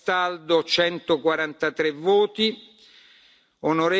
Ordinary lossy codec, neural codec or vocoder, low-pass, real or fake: none; none; none; real